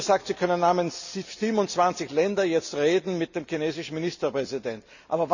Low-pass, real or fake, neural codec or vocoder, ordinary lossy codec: 7.2 kHz; real; none; none